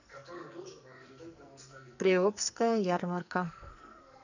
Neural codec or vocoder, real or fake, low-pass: codec, 44.1 kHz, 2.6 kbps, SNAC; fake; 7.2 kHz